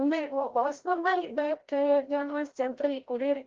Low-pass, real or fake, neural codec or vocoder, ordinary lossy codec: 7.2 kHz; fake; codec, 16 kHz, 0.5 kbps, FreqCodec, larger model; Opus, 16 kbps